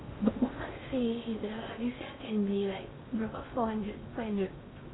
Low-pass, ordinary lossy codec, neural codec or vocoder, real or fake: 7.2 kHz; AAC, 16 kbps; codec, 16 kHz in and 24 kHz out, 0.6 kbps, FocalCodec, streaming, 4096 codes; fake